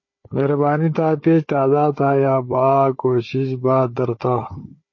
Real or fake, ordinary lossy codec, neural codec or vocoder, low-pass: fake; MP3, 32 kbps; codec, 16 kHz, 4 kbps, FunCodec, trained on Chinese and English, 50 frames a second; 7.2 kHz